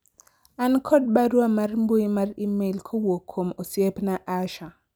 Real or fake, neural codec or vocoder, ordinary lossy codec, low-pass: real; none; none; none